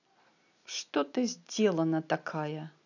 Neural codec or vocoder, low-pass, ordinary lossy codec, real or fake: none; 7.2 kHz; none; real